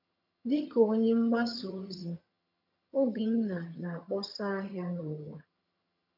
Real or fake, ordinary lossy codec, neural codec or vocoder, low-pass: fake; none; vocoder, 22.05 kHz, 80 mel bands, HiFi-GAN; 5.4 kHz